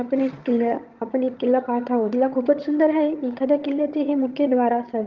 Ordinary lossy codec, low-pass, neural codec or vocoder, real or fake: Opus, 32 kbps; 7.2 kHz; vocoder, 22.05 kHz, 80 mel bands, HiFi-GAN; fake